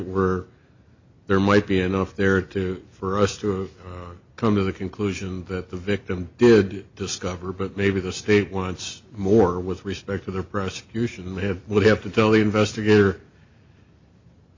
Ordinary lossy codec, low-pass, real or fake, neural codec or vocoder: MP3, 64 kbps; 7.2 kHz; real; none